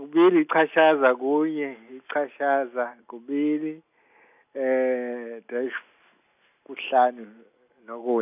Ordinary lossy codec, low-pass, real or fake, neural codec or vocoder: none; 3.6 kHz; real; none